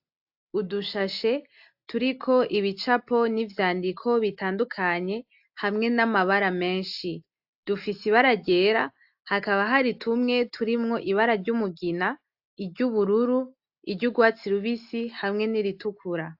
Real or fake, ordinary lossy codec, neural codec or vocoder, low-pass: real; AAC, 48 kbps; none; 5.4 kHz